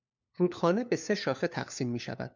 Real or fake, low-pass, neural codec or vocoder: fake; 7.2 kHz; codec, 16 kHz, 4 kbps, FunCodec, trained on LibriTTS, 50 frames a second